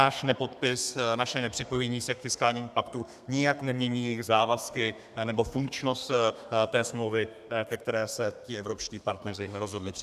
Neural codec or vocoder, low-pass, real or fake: codec, 32 kHz, 1.9 kbps, SNAC; 14.4 kHz; fake